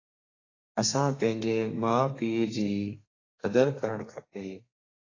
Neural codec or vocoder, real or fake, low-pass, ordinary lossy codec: codec, 32 kHz, 1.9 kbps, SNAC; fake; 7.2 kHz; AAC, 32 kbps